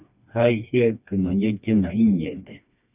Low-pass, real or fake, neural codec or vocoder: 3.6 kHz; fake; codec, 16 kHz, 2 kbps, FreqCodec, smaller model